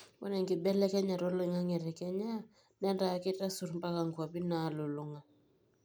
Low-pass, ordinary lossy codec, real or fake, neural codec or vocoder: none; none; real; none